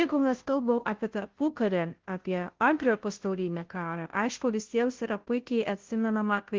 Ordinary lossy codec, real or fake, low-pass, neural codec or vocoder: Opus, 16 kbps; fake; 7.2 kHz; codec, 16 kHz, 0.5 kbps, FunCodec, trained on Chinese and English, 25 frames a second